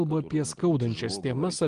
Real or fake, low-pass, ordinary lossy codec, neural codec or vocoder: real; 9.9 kHz; Opus, 24 kbps; none